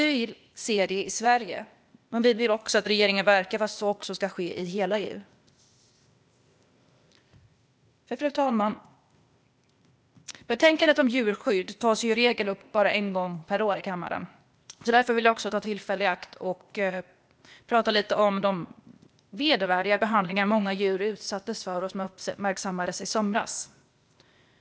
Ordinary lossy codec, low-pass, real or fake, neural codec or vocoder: none; none; fake; codec, 16 kHz, 0.8 kbps, ZipCodec